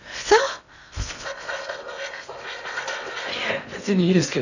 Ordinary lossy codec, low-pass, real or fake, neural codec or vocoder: none; 7.2 kHz; fake; codec, 16 kHz in and 24 kHz out, 0.6 kbps, FocalCodec, streaming, 2048 codes